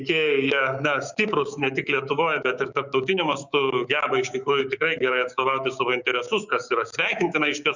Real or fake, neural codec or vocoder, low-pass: fake; codec, 16 kHz, 6 kbps, DAC; 7.2 kHz